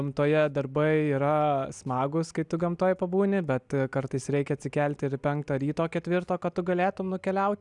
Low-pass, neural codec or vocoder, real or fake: 10.8 kHz; none; real